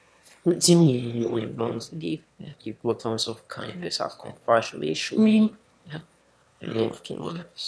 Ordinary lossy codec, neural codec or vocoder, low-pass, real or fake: none; autoencoder, 22.05 kHz, a latent of 192 numbers a frame, VITS, trained on one speaker; none; fake